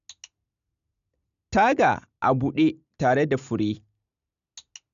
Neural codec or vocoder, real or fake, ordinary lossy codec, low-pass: none; real; none; 7.2 kHz